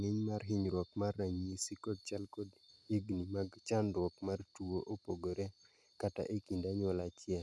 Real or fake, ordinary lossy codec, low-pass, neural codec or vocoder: real; none; none; none